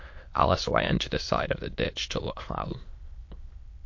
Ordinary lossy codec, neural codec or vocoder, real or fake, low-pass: MP3, 48 kbps; autoencoder, 22.05 kHz, a latent of 192 numbers a frame, VITS, trained on many speakers; fake; 7.2 kHz